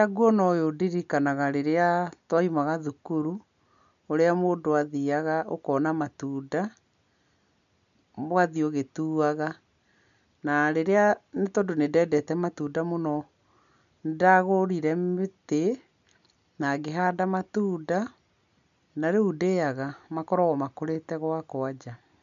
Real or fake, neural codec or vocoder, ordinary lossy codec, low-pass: real; none; none; 7.2 kHz